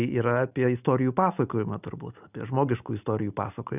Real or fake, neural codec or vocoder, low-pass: real; none; 3.6 kHz